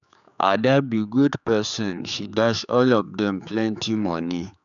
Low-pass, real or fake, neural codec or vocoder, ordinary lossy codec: 7.2 kHz; fake; codec, 16 kHz, 4 kbps, X-Codec, HuBERT features, trained on general audio; none